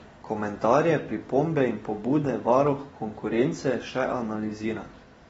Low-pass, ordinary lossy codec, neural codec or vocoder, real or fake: 10.8 kHz; AAC, 24 kbps; none; real